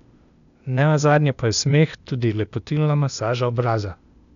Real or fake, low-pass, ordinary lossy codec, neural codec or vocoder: fake; 7.2 kHz; none; codec, 16 kHz, 0.8 kbps, ZipCodec